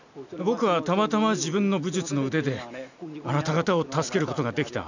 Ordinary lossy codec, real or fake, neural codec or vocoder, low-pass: none; real; none; 7.2 kHz